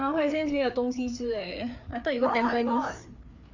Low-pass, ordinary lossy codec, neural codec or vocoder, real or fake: 7.2 kHz; none; codec, 16 kHz, 4 kbps, FreqCodec, larger model; fake